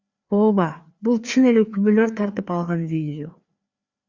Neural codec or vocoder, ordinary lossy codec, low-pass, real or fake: codec, 16 kHz, 2 kbps, FreqCodec, larger model; Opus, 64 kbps; 7.2 kHz; fake